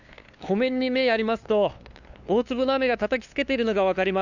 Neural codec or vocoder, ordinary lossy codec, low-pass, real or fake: codec, 16 kHz, 2 kbps, FunCodec, trained on LibriTTS, 25 frames a second; none; 7.2 kHz; fake